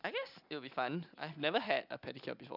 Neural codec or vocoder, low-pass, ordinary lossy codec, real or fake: none; 5.4 kHz; none; real